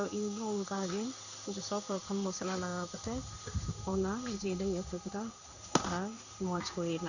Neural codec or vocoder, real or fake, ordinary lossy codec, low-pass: codec, 16 kHz in and 24 kHz out, 1 kbps, XY-Tokenizer; fake; none; 7.2 kHz